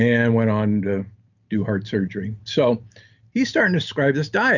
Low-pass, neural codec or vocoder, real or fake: 7.2 kHz; none; real